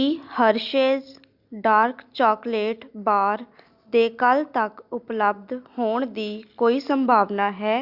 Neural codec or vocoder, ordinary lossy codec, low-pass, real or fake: none; Opus, 64 kbps; 5.4 kHz; real